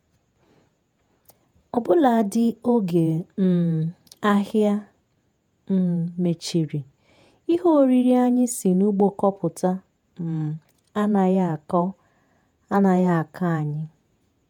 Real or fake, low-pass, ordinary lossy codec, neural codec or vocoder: fake; 19.8 kHz; MP3, 96 kbps; vocoder, 48 kHz, 128 mel bands, Vocos